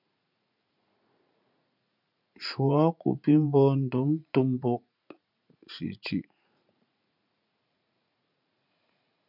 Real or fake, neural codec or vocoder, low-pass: fake; vocoder, 44.1 kHz, 80 mel bands, Vocos; 5.4 kHz